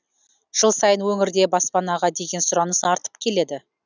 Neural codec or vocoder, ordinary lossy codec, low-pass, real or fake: none; none; 7.2 kHz; real